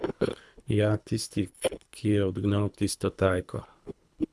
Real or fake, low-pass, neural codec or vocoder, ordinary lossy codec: fake; none; codec, 24 kHz, 3 kbps, HILCodec; none